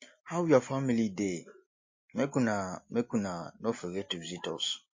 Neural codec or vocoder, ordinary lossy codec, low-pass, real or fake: none; MP3, 32 kbps; 7.2 kHz; real